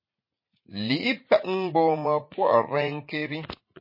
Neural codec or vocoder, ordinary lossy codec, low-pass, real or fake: vocoder, 44.1 kHz, 80 mel bands, Vocos; MP3, 24 kbps; 5.4 kHz; fake